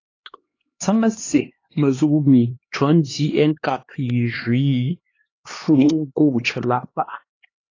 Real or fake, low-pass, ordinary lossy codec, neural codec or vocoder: fake; 7.2 kHz; AAC, 32 kbps; codec, 16 kHz, 2 kbps, X-Codec, HuBERT features, trained on LibriSpeech